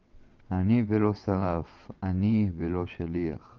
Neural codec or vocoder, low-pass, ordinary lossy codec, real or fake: vocoder, 22.05 kHz, 80 mel bands, WaveNeXt; 7.2 kHz; Opus, 24 kbps; fake